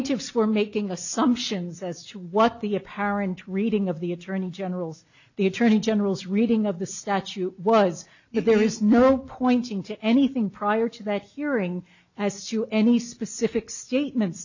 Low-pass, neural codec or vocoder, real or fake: 7.2 kHz; none; real